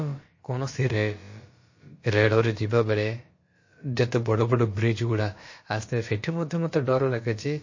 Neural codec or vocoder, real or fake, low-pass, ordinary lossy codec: codec, 16 kHz, about 1 kbps, DyCAST, with the encoder's durations; fake; 7.2 kHz; MP3, 32 kbps